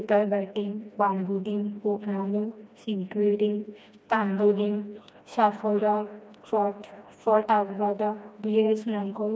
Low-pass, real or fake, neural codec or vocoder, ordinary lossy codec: none; fake; codec, 16 kHz, 1 kbps, FreqCodec, smaller model; none